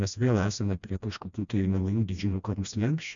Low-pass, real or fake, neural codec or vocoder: 7.2 kHz; fake; codec, 16 kHz, 1 kbps, FreqCodec, smaller model